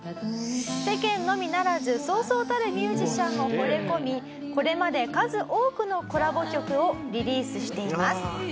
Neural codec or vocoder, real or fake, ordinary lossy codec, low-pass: none; real; none; none